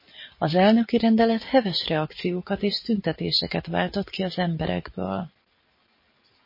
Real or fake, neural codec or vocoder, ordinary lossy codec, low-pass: real; none; MP3, 24 kbps; 5.4 kHz